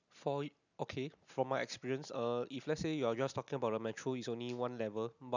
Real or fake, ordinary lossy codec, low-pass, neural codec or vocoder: real; none; 7.2 kHz; none